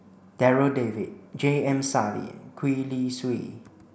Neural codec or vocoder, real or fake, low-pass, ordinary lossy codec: none; real; none; none